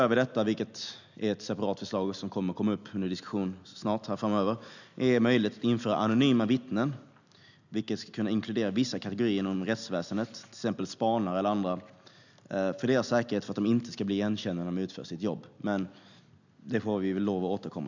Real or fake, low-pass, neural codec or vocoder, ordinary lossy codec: real; 7.2 kHz; none; none